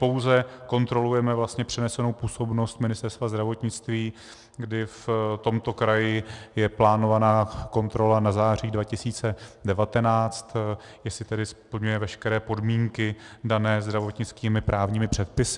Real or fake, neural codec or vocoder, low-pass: real; none; 10.8 kHz